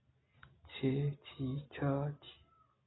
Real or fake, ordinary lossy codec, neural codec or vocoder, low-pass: real; AAC, 16 kbps; none; 7.2 kHz